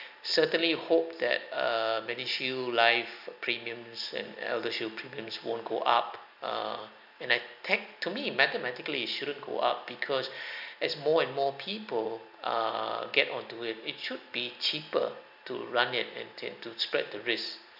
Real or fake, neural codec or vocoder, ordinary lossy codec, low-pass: real; none; none; 5.4 kHz